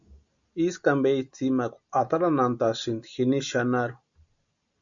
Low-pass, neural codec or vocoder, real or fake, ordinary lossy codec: 7.2 kHz; none; real; MP3, 96 kbps